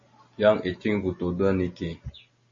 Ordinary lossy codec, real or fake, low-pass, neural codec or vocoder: MP3, 32 kbps; real; 7.2 kHz; none